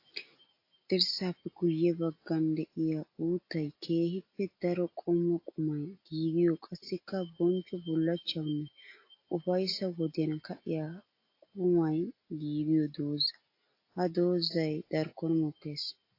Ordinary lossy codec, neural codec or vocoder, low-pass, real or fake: AAC, 32 kbps; none; 5.4 kHz; real